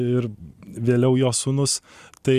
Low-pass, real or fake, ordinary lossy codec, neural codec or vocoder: 14.4 kHz; real; Opus, 64 kbps; none